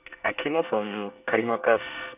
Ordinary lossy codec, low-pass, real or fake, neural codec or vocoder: none; 3.6 kHz; fake; codec, 24 kHz, 1 kbps, SNAC